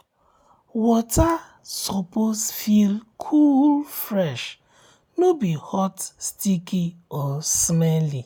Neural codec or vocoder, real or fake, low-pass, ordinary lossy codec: none; real; none; none